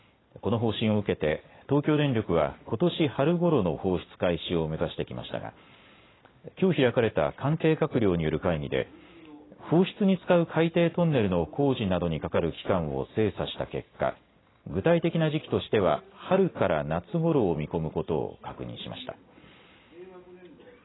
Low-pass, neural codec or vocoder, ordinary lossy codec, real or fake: 7.2 kHz; none; AAC, 16 kbps; real